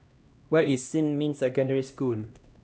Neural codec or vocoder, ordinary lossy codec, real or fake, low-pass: codec, 16 kHz, 1 kbps, X-Codec, HuBERT features, trained on LibriSpeech; none; fake; none